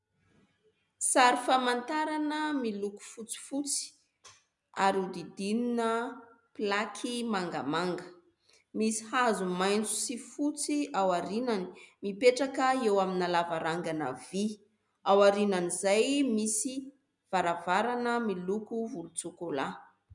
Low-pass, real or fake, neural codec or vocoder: 10.8 kHz; real; none